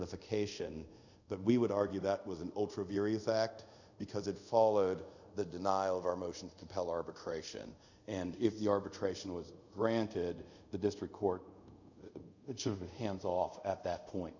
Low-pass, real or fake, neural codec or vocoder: 7.2 kHz; fake; codec, 24 kHz, 0.5 kbps, DualCodec